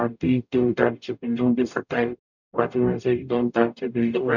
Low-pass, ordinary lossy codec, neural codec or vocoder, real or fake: 7.2 kHz; none; codec, 44.1 kHz, 0.9 kbps, DAC; fake